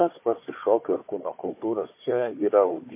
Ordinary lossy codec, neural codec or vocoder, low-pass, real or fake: MP3, 24 kbps; codec, 16 kHz, 4 kbps, FunCodec, trained on Chinese and English, 50 frames a second; 3.6 kHz; fake